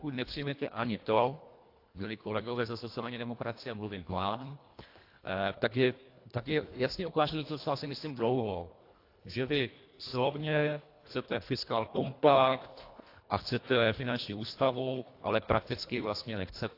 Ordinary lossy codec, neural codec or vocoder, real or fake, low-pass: AAC, 32 kbps; codec, 24 kHz, 1.5 kbps, HILCodec; fake; 5.4 kHz